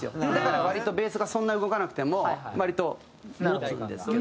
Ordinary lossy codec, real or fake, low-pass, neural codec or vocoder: none; real; none; none